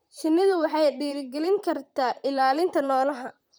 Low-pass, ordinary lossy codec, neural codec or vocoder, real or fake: none; none; vocoder, 44.1 kHz, 128 mel bands, Pupu-Vocoder; fake